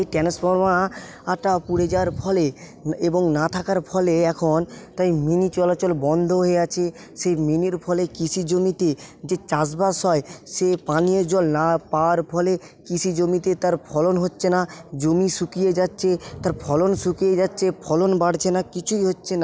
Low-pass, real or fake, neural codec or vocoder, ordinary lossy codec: none; real; none; none